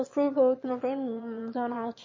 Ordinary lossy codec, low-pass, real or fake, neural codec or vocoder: MP3, 32 kbps; 7.2 kHz; fake; autoencoder, 22.05 kHz, a latent of 192 numbers a frame, VITS, trained on one speaker